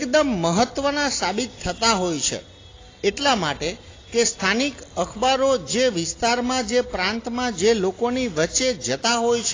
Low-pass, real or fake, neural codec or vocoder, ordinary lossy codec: 7.2 kHz; real; none; AAC, 32 kbps